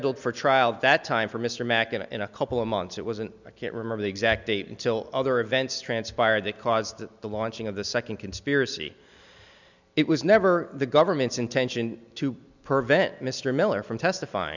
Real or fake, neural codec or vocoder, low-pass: real; none; 7.2 kHz